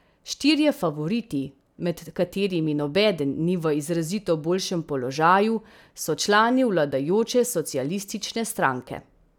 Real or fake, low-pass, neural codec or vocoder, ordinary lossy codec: real; 19.8 kHz; none; none